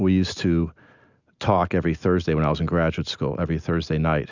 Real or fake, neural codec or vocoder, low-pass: real; none; 7.2 kHz